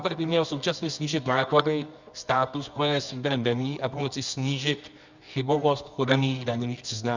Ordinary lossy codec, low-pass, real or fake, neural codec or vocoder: Opus, 64 kbps; 7.2 kHz; fake; codec, 24 kHz, 0.9 kbps, WavTokenizer, medium music audio release